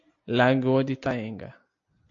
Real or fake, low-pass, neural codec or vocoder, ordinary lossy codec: real; 7.2 kHz; none; AAC, 48 kbps